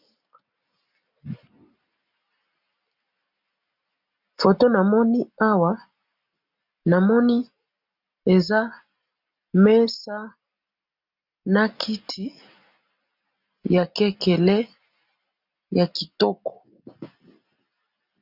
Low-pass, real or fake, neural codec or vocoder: 5.4 kHz; real; none